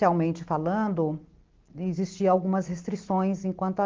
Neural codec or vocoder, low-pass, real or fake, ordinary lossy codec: none; 7.2 kHz; real; Opus, 16 kbps